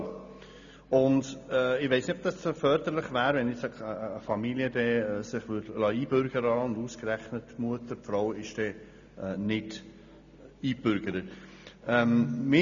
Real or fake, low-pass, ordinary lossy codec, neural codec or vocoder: real; 7.2 kHz; none; none